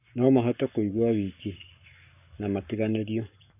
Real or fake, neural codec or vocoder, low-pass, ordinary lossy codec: fake; codec, 44.1 kHz, 7.8 kbps, Pupu-Codec; 3.6 kHz; none